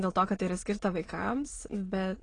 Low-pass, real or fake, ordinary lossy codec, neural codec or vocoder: 9.9 kHz; fake; AAC, 32 kbps; autoencoder, 22.05 kHz, a latent of 192 numbers a frame, VITS, trained on many speakers